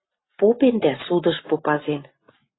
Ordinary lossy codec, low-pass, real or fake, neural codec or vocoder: AAC, 16 kbps; 7.2 kHz; real; none